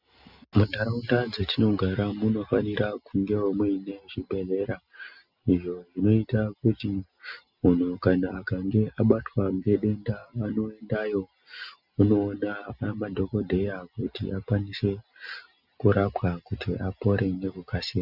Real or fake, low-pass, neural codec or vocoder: real; 5.4 kHz; none